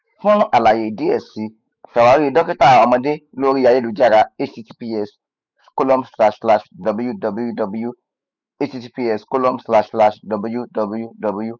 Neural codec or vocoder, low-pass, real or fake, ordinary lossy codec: autoencoder, 48 kHz, 128 numbers a frame, DAC-VAE, trained on Japanese speech; 7.2 kHz; fake; none